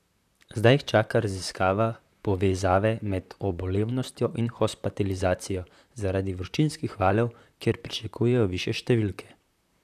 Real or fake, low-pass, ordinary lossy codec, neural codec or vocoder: fake; 14.4 kHz; none; vocoder, 44.1 kHz, 128 mel bands, Pupu-Vocoder